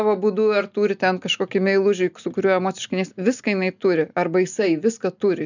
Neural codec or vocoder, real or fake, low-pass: none; real; 7.2 kHz